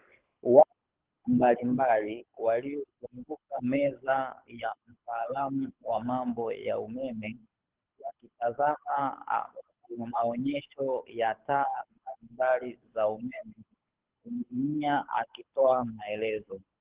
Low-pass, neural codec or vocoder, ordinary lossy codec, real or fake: 3.6 kHz; codec, 24 kHz, 6 kbps, HILCodec; Opus, 16 kbps; fake